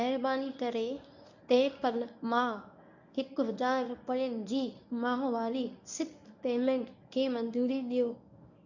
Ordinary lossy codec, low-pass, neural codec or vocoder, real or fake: none; 7.2 kHz; codec, 24 kHz, 0.9 kbps, WavTokenizer, medium speech release version 1; fake